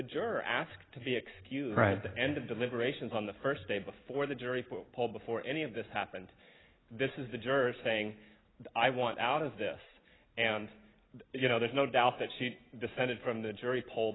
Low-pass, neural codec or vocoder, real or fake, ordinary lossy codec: 7.2 kHz; none; real; AAC, 16 kbps